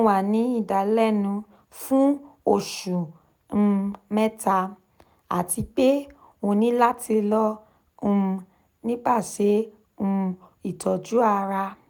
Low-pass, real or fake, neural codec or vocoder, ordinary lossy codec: none; real; none; none